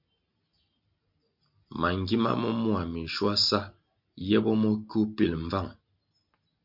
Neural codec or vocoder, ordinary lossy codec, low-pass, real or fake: none; MP3, 48 kbps; 5.4 kHz; real